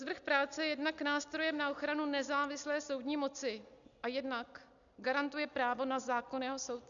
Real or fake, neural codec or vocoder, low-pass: real; none; 7.2 kHz